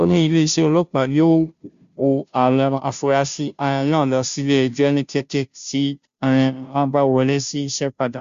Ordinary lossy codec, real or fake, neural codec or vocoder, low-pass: Opus, 64 kbps; fake; codec, 16 kHz, 0.5 kbps, FunCodec, trained on Chinese and English, 25 frames a second; 7.2 kHz